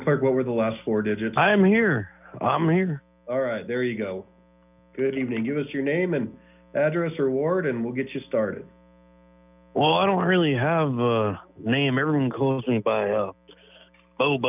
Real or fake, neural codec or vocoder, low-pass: real; none; 3.6 kHz